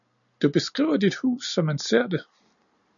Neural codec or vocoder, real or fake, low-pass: none; real; 7.2 kHz